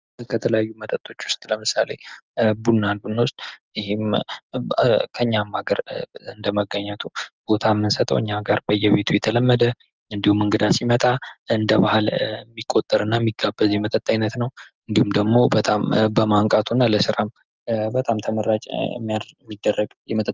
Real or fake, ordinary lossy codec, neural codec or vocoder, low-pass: real; Opus, 24 kbps; none; 7.2 kHz